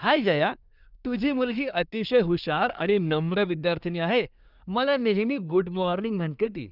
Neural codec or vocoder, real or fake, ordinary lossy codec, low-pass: codec, 24 kHz, 1 kbps, SNAC; fake; none; 5.4 kHz